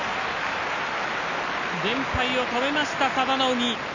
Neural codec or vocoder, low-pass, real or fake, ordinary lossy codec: none; 7.2 kHz; real; none